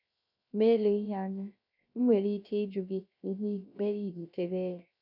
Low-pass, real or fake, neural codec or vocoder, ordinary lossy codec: 5.4 kHz; fake; codec, 16 kHz, 0.3 kbps, FocalCodec; none